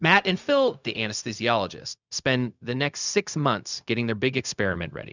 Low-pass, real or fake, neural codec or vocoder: 7.2 kHz; fake; codec, 16 kHz, 0.4 kbps, LongCat-Audio-Codec